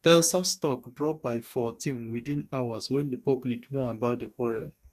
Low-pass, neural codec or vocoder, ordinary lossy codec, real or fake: 14.4 kHz; codec, 44.1 kHz, 2.6 kbps, DAC; none; fake